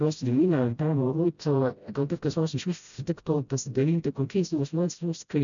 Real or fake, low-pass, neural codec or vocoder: fake; 7.2 kHz; codec, 16 kHz, 0.5 kbps, FreqCodec, smaller model